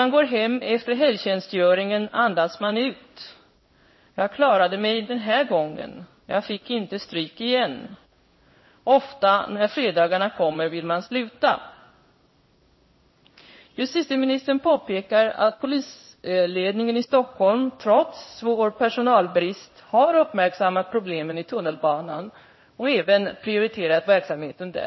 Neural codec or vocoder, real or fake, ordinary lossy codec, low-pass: codec, 16 kHz in and 24 kHz out, 1 kbps, XY-Tokenizer; fake; MP3, 24 kbps; 7.2 kHz